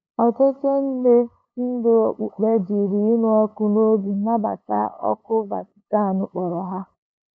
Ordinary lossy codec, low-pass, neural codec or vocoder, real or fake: none; none; codec, 16 kHz, 8 kbps, FunCodec, trained on LibriTTS, 25 frames a second; fake